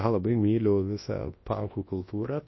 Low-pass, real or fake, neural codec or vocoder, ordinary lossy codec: 7.2 kHz; fake; codec, 24 kHz, 0.9 kbps, WavTokenizer, large speech release; MP3, 24 kbps